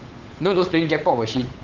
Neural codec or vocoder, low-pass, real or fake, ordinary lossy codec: codec, 16 kHz, 8 kbps, FunCodec, trained on Chinese and English, 25 frames a second; 7.2 kHz; fake; Opus, 16 kbps